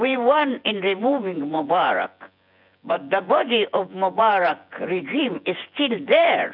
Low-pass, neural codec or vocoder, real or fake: 5.4 kHz; vocoder, 24 kHz, 100 mel bands, Vocos; fake